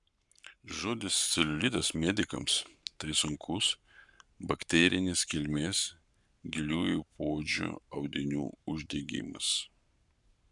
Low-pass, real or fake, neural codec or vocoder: 10.8 kHz; fake; codec, 44.1 kHz, 7.8 kbps, Pupu-Codec